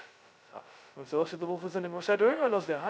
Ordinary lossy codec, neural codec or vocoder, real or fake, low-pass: none; codec, 16 kHz, 0.2 kbps, FocalCodec; fake; none